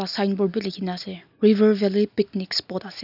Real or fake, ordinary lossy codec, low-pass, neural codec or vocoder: real; none; 5.4 kHz; none